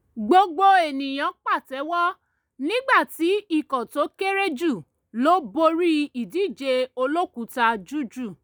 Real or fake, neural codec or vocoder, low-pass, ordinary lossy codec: real; none; none; none